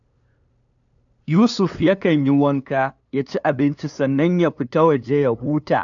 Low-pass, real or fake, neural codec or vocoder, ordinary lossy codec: 7.2 kHz; fake; codec, 16 kHz, 2 kbps, FunCodec, trained on LibriTTS, 25 frames a second; none